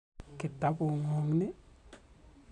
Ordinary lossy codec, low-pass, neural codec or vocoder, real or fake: none; 10.8 kHz; none; real